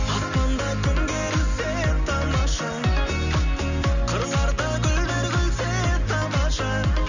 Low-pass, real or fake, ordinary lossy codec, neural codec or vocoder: 7.2 kHz; real; none; none